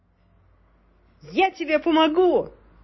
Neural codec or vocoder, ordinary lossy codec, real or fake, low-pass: none; MP3, 24 kbps; real; 7.2 kHz